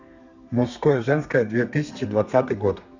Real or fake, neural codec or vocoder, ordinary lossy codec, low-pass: fake; codec, 32 kHz, 1.9 kbps, SNAC; Opus, 64 kbps; 7.2 kHz